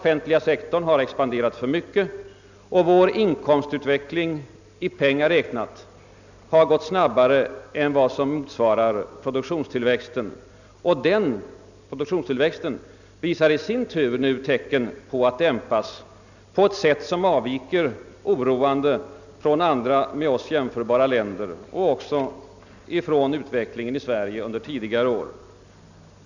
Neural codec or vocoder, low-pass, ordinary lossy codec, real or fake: none; 7.2 kHz; none; real